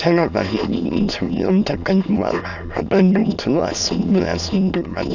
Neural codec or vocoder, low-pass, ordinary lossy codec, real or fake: autoencoder, 22.05 kHz, a latent of 192 numbers a frame, VITS, trained on many speakers; 7.2 kHz; none; fake